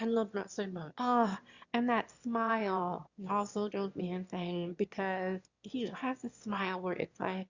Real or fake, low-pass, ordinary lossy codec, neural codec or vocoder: fake; 7.2 kHz; Opus, 64 kbps; autoencoder, 22.05 kHz, a latent of 192 numbers a frame, VITS, trained on one speaker